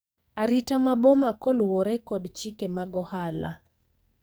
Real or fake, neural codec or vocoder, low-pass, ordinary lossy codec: fake; codec, 44.1 kHz, 2.6 kbps, SNAC; none; none